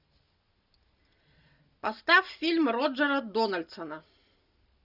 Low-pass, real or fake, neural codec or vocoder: 5.4 kHz; real; none